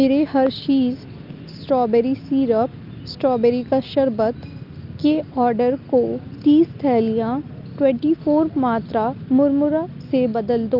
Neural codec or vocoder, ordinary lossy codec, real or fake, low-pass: none; Opus, 32 kbps; real; 5.4 kHz